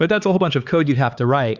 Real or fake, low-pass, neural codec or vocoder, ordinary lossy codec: fake; 7.2 kHz; codec, 16 kHz, 4 kbps, X-Codec, HuBERT features, trained on LibriSpeech; Opus, 64 kbps